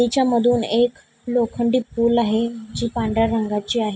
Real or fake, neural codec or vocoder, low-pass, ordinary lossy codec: real; none; none; none